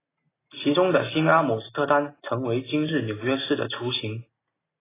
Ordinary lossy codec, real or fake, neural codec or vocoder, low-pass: AAC, 16 kbps; real; none; 3.6 kHz